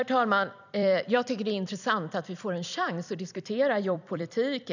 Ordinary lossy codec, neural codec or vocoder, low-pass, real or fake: none; vocoder, 44.1 kHz, 128 mel bands every 256 samples, BigVGAN v2; 7.2 kHz; fake